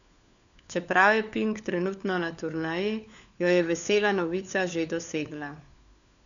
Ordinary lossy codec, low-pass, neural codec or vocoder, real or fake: none; 7.2 kHz; codec, 16 kHz, 4 kbps, FunCodec, trained on LibriTTS, 50 frames a second; fake